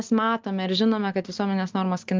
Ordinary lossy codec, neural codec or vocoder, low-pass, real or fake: Opus, 32 kbps; none; 7.2 kHz; real